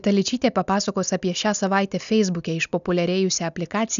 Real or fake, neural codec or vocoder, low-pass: real; none; 7.2 kHz